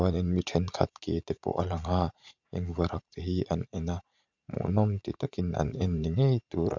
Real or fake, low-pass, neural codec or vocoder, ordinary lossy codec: fake; 7.2 kHz; vocoder, 44.1 kHz, 80 mel bands, Vocos; none